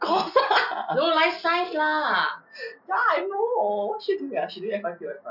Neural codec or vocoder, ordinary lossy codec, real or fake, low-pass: none; none; real; 5.4 kHz